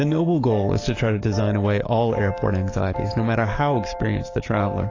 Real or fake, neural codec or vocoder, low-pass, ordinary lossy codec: fake; codec, 44.1 kHz, 7.8 kbps, DAC; 7.2 kHz; AAC, 32 kbps